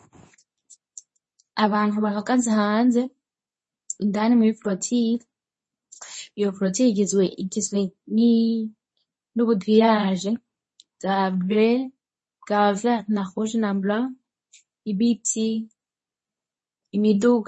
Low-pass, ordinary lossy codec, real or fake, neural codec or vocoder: 10.8 kHz; MP3, 32 kbps; fake; codec, 24 kHz, 0.9 kbps, WavTokenizer, medium speech release version 2